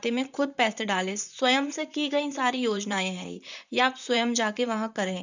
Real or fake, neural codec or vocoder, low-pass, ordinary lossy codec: fake; vocoder, 44.1 kHz, 128 mel bands, Pupu-Vocoder; 7.2 kHz; none